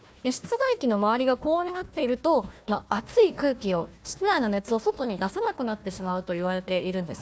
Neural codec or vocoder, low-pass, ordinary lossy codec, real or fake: codec, 16 kHz, 1 kbps, FunCodec, trained on Chinese and English, 50 frames a second; none; none; fake